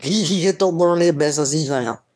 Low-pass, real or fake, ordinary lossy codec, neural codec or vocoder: none; fake; none; autoencoder, 22.05 kHz, a latent of 192 numbers a frame, VITS, trained on one speaker